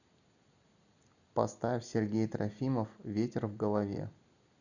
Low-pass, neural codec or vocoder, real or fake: 7.2 kHz; none; real